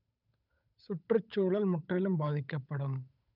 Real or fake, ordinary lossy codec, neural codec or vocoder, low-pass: fake; none; codec, 16 kHz, 8 kbps, FunCodec, trained on Chinese and English, 25 frames a second; 5.4 kHz